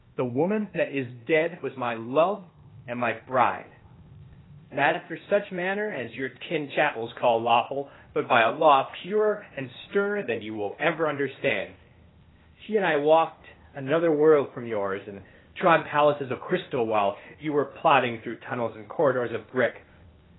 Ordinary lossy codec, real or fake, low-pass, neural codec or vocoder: AAC, 16 kbps; fake; 7.2 kHz; codec, 16 kHz, 0.8 kbps, ZipCodec